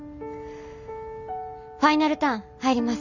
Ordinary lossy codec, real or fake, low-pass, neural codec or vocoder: none; real; 7.2 kHz; none